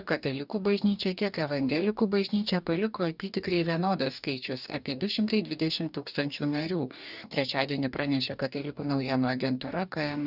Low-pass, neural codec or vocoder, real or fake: 5.4 kHz; codec, 44.1 kHz, 2.6 kbps, DAC; fake